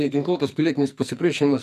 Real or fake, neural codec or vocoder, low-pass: fake; codec, 44.1 kHz, 2.6 kbps, SNAC; 14.4 kHz